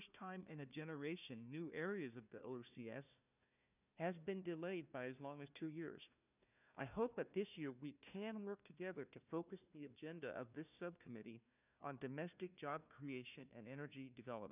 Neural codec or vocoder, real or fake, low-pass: codec, 16 kHz, 1 kbps, FunCodec, trained on Chinese and English, 50 frames a second; fake; 3.6 kHz